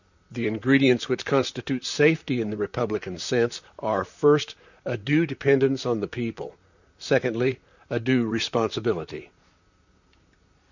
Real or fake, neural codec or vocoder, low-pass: fake; vocoder, 44.1 kHz, 128 mel bands, Pupu-Vocoder; 7.2 kHz